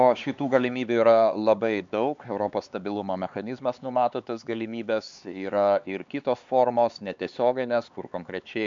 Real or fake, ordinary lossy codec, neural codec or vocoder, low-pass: fake; MP3, 64 kbps; codec, 16 kHz, 4 kbps, X-Codec, HuBERT features, trained on LibriSpeech; 7.2 kHz